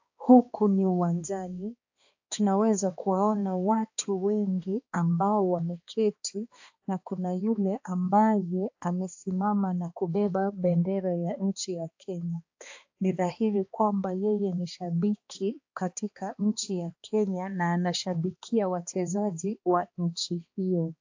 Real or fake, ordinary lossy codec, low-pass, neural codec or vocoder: fake; AAC, 48 kbps; 7.2 kHz; codec, 16 kHz, 2 kbps, X-Codec, HuBERT features, trained on balanced general audio